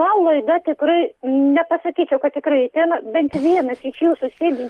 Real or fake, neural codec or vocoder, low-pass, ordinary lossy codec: real; none; 14.4 kHz; Opus, 24 kbps